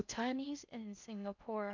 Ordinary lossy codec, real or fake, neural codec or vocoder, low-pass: none; fake; codec, 16 kHz in and 24 kHz out, 0.6 kbps, FocalCodec, streaming, 4096 codes; 7.2 kHz